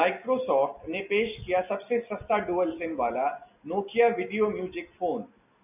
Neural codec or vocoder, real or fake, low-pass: none; real; 3.6 kHz